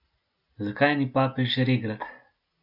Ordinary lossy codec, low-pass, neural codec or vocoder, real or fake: none; 5.4 kHz; none; real